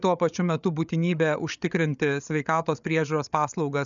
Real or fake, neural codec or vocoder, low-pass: fake; codec, 16 kHz, 8 kbps, FreqCodec, larger model; 7.2 kHz